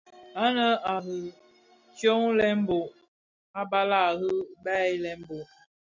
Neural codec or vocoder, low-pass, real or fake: none; 7.2 kHz; real